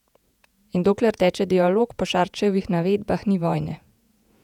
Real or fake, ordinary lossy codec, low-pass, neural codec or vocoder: fake; none; 19.8 kHz; vocoder, 48 kHz, 128 mel bands, Vocos